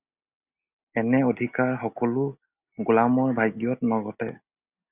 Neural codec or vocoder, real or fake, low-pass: none; real; 3.6 kHz